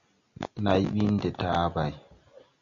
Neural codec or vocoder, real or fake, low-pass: none; real; 7.2 kHz